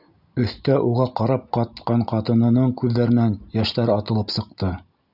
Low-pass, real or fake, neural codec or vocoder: 5.4 kHz; real; none